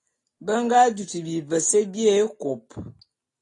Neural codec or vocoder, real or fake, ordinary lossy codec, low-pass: none; real; AAC, 32 kbps; 10.8 kHz